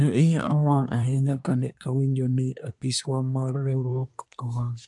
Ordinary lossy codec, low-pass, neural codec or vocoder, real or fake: AAC, 64 kbps; 10.8 kHz; codec, 24 kHz, 1 kbps, SNAC; fake